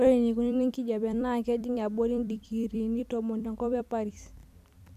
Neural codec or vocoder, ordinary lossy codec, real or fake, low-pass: vocoder, 44.1 kHz, 128 mel bands every 256 samples, BigVGAN v2; none; fake; 14.4 kHz